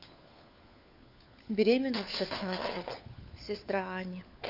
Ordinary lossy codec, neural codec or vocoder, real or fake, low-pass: none; codec, 16 kHz, 4 kbps, FunCodec, trained on LibriTTS, 50 frames a second; fake; 5.4 kHz